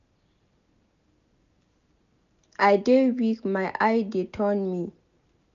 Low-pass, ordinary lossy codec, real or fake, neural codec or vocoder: 7.2 kHz; none; real; none